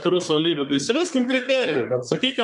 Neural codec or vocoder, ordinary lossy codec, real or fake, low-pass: codec, 24 kHz, 1 kbps, SNAC; MP3, 64 kbps; fake; 10.8 kHz